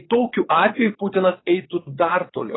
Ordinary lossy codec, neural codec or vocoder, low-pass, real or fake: AAC, 16 kbps; vocoder, 22.05 kHz, 80 mel bands, WaveNeXt; 7.2 kHz; fake